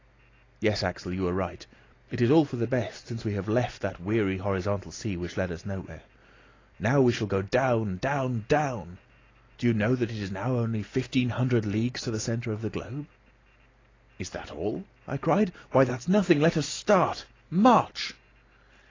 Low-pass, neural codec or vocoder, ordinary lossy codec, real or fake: 7.2 kHz; none; AAC, 32 kbps; real